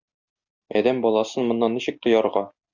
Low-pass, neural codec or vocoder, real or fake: 7.2 kHz; none; real